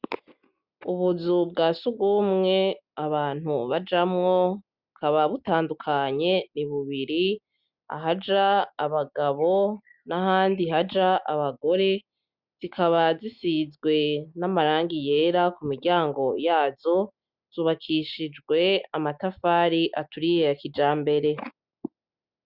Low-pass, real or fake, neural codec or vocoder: 5.4 kHz; real; none